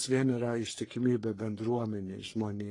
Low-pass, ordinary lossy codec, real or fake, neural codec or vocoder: 10.8 kHz; AAC, 32 kbps; fake; codec, 44.1 kHz, 3.4 kbps, Pupu-Codec